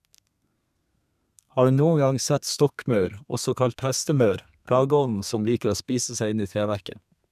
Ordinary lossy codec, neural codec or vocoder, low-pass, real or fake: none; codec, 32 kHz, 1.9 kbps, SNAC; 14.4 kHz; fake